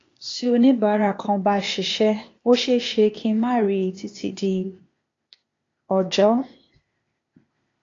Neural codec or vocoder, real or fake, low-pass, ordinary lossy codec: codec, 16 kHz, 0.8 kbps, ZipCodec; fake; 7.2 kHz; AAC, 32 kbps